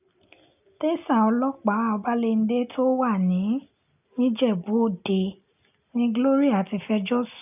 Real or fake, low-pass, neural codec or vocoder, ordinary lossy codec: real; 3.6 kHz; none; none